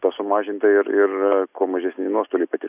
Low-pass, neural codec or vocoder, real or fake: 3.6 kHz; none; real